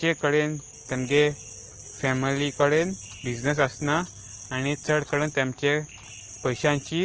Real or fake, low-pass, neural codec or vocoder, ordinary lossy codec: real; 7.2 kHz; none; Opus, 16 kbps